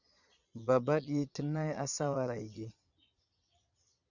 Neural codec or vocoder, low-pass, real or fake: vocoder, 22.05 kHz, 80 mel bands, Vocos; 7.2 kHz; fake